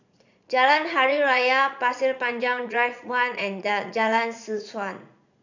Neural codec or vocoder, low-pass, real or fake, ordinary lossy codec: vocoder, 44.1 kHz, 80 mel bands, Vocos; 7.2 kHz; fake; none